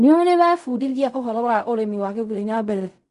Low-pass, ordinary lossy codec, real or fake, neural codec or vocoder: 10.8 kHz; AAC, 64 kbps; fake; codec, 16 kHz in and 24 kHz out, 0.4 kbps, LongCat-Audio-Codec, fine tuned four codebook decoder